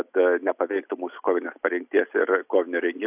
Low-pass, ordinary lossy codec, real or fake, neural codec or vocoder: 3.6 kHz; AAC, 32 kbps; real; none